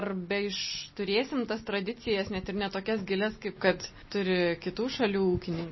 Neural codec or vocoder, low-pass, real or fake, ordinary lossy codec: none; 7.2 kHz; real; MP3, 24 kbps